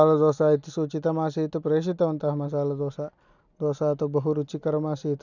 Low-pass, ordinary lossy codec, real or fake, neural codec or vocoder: 7.2 kHz; none; real; none